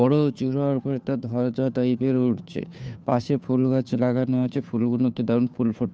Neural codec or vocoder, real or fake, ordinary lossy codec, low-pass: codec, 16 kHz, 2 kbps, FunCodec, trained on Chinese and English, 25 frames a second; fake; none; none